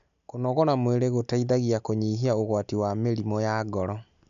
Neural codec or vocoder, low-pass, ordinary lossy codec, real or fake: none; 7.2 kHz; none; real